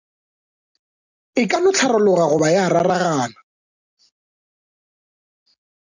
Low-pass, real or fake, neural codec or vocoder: 7.2 kHz; real; none